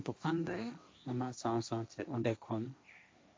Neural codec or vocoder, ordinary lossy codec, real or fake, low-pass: codec, 16 kHz, 1.1 kbps, Voila-Tokenizer; none; fake; none